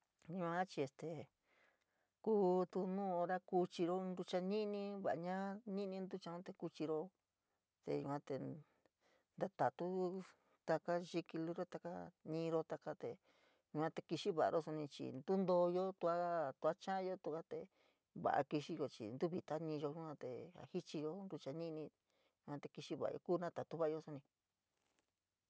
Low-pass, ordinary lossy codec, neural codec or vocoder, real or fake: none; none; none; real